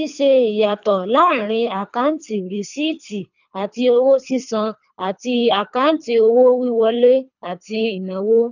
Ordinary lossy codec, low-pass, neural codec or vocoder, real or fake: none; 7.2 kHz; codec, 24 kHz, 3 kbps, HILCodec; fake